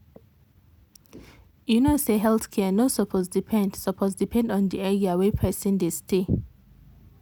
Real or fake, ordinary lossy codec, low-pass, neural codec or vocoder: real; none; none; none